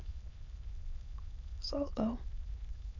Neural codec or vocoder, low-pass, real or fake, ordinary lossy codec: autoencoder, 22.05 kHz, a latent of 192 numbers a frame, VITS, trained on many speakers; 7.2 kHz; fake; none